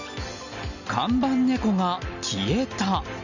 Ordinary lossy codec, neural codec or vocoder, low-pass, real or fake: none; none; 7.2 kHz; real